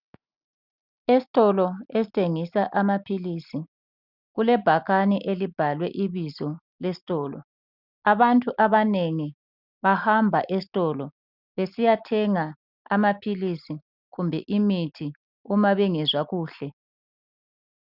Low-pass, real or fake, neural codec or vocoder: 5.4 kHz; real; none